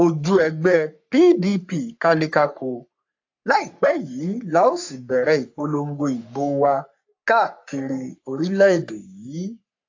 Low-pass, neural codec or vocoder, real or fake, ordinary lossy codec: 7.2 kHz; codec, 44.1 kHz, 3.4 kbps, Pupu-Codec; fake; AAC, 48 kbps